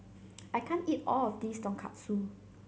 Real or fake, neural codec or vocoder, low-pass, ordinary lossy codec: real; none; none; none